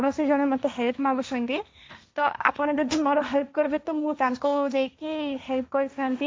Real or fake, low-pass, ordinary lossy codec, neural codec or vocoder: fake; none; none; codec, 16 kHz, 1.1 kbps, Voila-Tokenizer